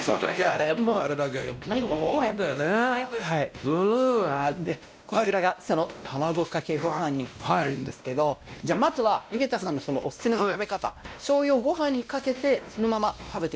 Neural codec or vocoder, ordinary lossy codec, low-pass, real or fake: codec, 16 kHz, 1 kbps, X-Codec, WavLM features, trained on Multilingual LibriSpeech; none; none; fake